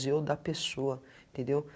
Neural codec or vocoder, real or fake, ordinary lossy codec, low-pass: none; real; none; none